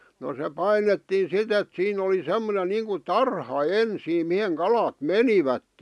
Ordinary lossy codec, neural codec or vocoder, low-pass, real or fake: none; none; none; real